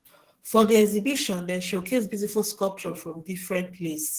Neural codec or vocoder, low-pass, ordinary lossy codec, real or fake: codec, 44.1 kHz, 2.6 kbps, SNAC; 14.4 kHz; Opus, 16 kbps; fake